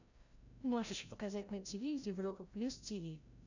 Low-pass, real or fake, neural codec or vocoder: 7.2 kHz; fake; codec, 16 kHz, 0.5 kbps, FreqCodec, larger model